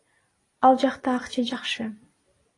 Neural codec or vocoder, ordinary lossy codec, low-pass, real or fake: none; AAC, 32 kbps; 10.8 kHz; real